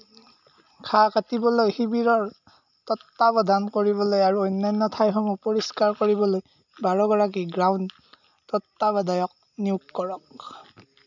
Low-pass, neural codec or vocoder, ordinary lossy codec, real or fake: 7.2 kHz; none; none; real